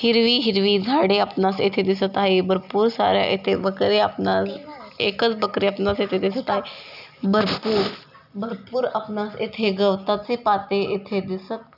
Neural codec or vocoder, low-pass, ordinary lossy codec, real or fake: none; 5.4 kHz; none; real